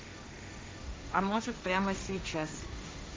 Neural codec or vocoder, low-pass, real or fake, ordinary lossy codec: codec, 16 kHz, 1.1 kbps, Voila-Tokenizer; none; fake; none